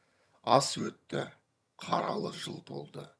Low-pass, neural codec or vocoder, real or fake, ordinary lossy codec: none; vocoder, 22.05 kHz, 80 mel bands, HiFi-GAN; fake; none